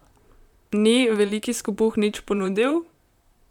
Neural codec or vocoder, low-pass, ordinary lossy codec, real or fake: vocoder, 44.1 kHz, 128 mel bands, Pupu-Vocoder; 19.8 kHz; none; fake